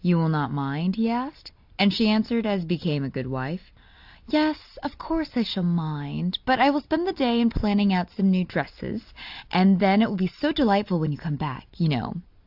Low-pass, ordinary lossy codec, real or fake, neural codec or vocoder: 5.4 kHz; AAC, 48 kbps; real; none